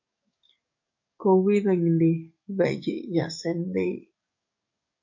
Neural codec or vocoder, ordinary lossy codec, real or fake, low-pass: codec, 44.1 kHz, 7.8 kbps, DAC; MP3, 48 kbps; fake; 7.2 kHz